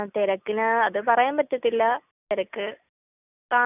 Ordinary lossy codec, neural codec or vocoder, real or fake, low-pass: none; none; real; 3.6 kHz